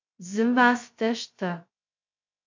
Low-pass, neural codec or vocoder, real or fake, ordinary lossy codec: 7.2 kHz; codec, 16 kHz, 0.2 kbps, FocalCodec; fake; MP3, 48 kbps